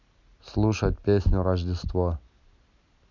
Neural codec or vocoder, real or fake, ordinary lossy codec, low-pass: none; real; none; 7.2 kHz